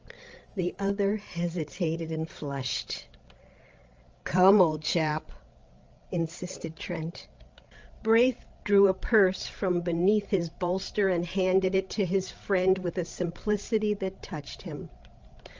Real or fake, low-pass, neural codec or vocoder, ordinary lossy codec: fake; 7.2 kHz; codec, 16 kHz, 16 kbps, FreqCodec, larger model; Opus, 24 kbps